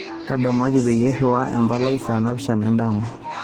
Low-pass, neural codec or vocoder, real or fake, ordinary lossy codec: 19.8 kHz; codec, 44.1 kHz, 2.6 kbps, DAC; fake; Opus, 16 kbps